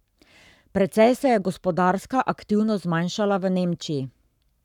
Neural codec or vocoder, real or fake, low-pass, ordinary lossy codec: codec, 44.1 kHz, 7.8 kbps, Pupu-Codec; fake; 19.8 kHz; none